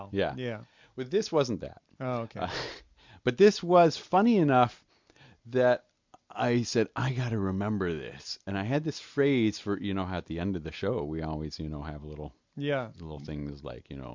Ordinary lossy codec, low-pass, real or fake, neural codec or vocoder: MP3, 64 kbps; 7.2 kHz; real; none